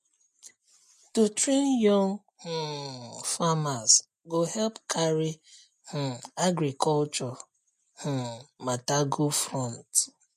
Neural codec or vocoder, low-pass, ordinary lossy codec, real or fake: none; 14.4 kHz; MP3, 64 kbps; real